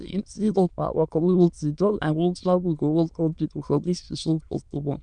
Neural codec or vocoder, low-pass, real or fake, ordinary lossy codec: autoencoder, 22.05 kHz, a latent of 192 numbers a frame, VITS, trained on many speakers; 9.9 kHz; fake; none